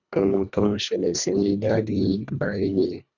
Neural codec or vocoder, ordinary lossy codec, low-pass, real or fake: codec, 24 kHz, 1.5 kbps, HILCodec; none; 7.2 kHz; fake